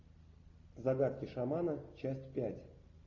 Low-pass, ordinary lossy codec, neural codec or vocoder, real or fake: 7.2 kHz; AAC, 48 kbps; none; real